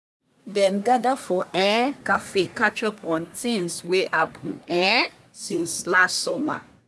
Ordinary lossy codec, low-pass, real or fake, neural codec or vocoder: none; none; fake; codec, 24 kHz, 1 kbps, SNAC